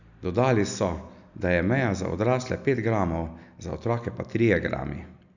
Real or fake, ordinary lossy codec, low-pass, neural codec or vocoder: real; none; 7.2 kHz; none